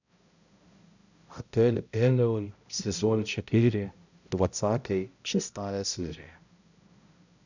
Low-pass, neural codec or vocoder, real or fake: 7.2 kHz; codec, 16 kHz, 0.5 kbps, X-Codec, HuBERT features, trained on balanced general audio; fake